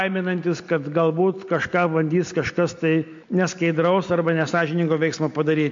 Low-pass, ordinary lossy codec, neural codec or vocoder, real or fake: 7.2 kHz; MP3, 48 kbps; none; real